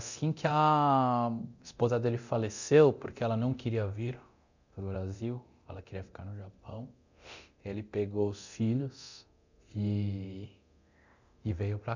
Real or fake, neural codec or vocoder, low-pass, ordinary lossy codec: fake; codec, 24 kHz, 0.9 kbps, DualCodec; 7.2 kHz; none